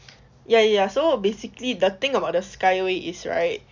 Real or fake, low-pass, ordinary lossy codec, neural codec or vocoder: real; 7.2 kHz; Opus, 64 kbps; none